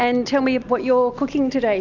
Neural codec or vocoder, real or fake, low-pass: none; real; 7.2 kHz